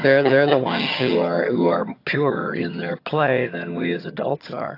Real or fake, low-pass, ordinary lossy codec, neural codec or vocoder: fake; 5.4 kHz; AAC, 32 kbps; vocoder, 22.05 kHz, 80 mel bands, HiFi-GAN